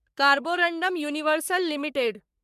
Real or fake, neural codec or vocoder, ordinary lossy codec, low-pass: fake; codec, 44.1 kHz, 3.4 kbps, Pupu-Codec; MP3, 96 kbps; 14.4 kHz